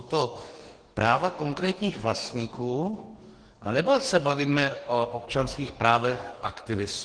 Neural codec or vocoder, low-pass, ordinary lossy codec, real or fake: codec, 44.1 kHz, 2.6 kbps, DAC; 9.9 kHz; Opus, 16 kbps; fake